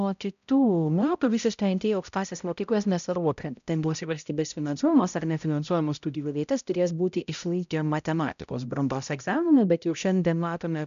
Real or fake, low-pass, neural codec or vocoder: fake; 7.2 kHz; codec, 16 kHz, 0.5 kbps, X-Codec, HuBERT features, trained on balanced general audio